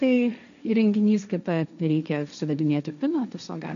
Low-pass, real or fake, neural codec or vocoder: 7.2 kHz; fake; codec, 16 kHz, 1.1 kbps, Voila-Tokenizer